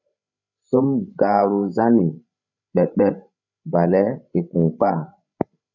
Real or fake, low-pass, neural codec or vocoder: fake; 7.2 kHz; codec, 16 kHz, 16 kbps, FreqCodec, larger model